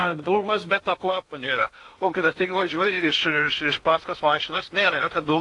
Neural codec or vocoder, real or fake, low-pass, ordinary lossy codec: codec, 16 kHz in and 24 kHz out, 0.8 kbps, FocalCodec, streaming, 65536 codes; fake; 10.8 kHz; AAC, 48 kbps